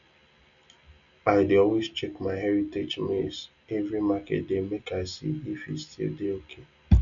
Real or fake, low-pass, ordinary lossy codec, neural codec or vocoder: real; 7.2 kHz; none; none